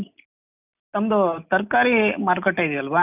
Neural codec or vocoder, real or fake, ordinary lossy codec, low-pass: none; real; none; 3.6 kHz